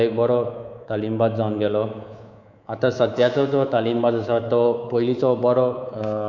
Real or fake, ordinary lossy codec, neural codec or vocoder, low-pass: fake; none; codec, 24 kHz, 3.1 kbps, DualCodec; 7.2 kHz